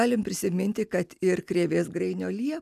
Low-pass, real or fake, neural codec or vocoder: 14.4 kHz; real; none